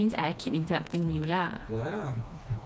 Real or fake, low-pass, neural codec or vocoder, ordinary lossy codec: fake; none; codec, 16 kHz, 2 kbps, FreqCodec, smaller model; none